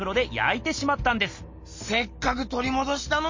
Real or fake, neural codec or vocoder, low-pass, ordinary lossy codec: real; none; 7.2 kHz; MP3, 32 kbps